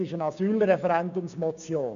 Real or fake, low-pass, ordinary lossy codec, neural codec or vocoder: fake; 7.2 kHz; none; codec, 16 kHz, 6 kbps, DAC